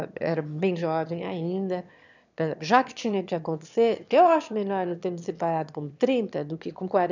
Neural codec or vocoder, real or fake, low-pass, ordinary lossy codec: autoencoder, 22.05 kHz, a latent of 192 numbers a frame, VITS, trained on one speaker; fake; 7.2 kHz; none